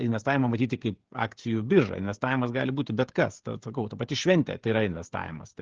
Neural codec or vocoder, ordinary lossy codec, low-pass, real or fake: codec, 16 kHz, 16 kbps, FreqCodec, smaller model; Opus, 16 kbps; 7.2 kHz; fake